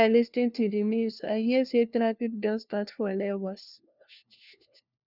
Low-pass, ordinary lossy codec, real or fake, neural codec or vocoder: 5.4 kHz; none; fake; codec, 16 kHz, 1 kbps, FunCodec, trained on LibriTTS, 50 frames a second